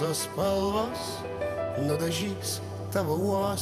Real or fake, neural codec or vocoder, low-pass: fake; vocoder, 44.1 kHz, 128 mel bands every 512 samples, BigVGAN v2; 14.4 kHz